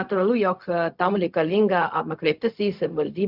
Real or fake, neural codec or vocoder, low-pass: fake; codec, 16 kHz, 0.4 kbps, LongCat-Audio-Codec; 5.4 kHz